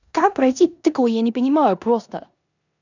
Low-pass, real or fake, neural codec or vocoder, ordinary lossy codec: 7.2 kHz; fake; codec, 16 kHz in and 24 kHz out, 0.9 kbps, LongCat-Audio-Codec, four codebook decoder; none